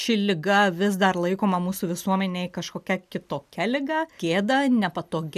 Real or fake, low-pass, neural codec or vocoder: real; 14.4 kHz; none